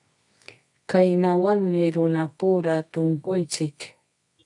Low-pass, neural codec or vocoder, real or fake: 10.8 kHz; codec, 24 kHz, 0.9 kbps, WavTokenizer, medium music audio release; fake